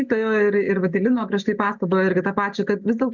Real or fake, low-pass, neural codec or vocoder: real; 7.2 kHz; none